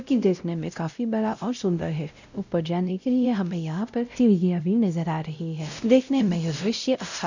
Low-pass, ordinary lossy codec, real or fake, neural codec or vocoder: 7.2 kHz; none; fake; codec, 16 kHz, 0.5 kbps, X-Codec, WavLM features, trained on Multilingual LibriSpeech